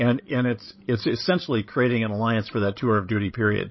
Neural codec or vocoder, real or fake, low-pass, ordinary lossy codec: codec, 16 kHz, 16 kbps, FreqCodec, larger model; fake; 7.2 kHz; MP3, 24 kbps